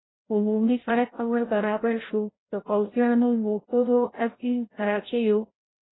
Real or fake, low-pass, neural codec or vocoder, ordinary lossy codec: fake; 7.2 kHz; codec, 16 kHz, 0.5 kbps, FreqCodec, larger model; AAC, 16 kbps